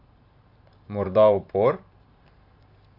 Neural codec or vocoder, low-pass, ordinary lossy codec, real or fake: vocoder, 44.1 kHz, 128 mel bands every 512 samples, BigVGAN v2; 5.4 kHz; Opus, 64 kbps; fake